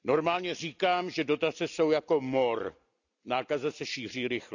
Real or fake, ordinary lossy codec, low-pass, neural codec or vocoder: real; none; 7.2 kHz; none